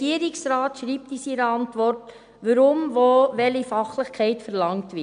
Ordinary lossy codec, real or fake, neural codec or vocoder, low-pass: none; real; none; 9.9 kHz